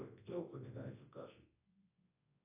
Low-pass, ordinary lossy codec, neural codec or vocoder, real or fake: 3.6 kHz; AAC, 16 kbps; codec, 24 kHz, 0.9 kbps, WavTokenizer, large speech release; fake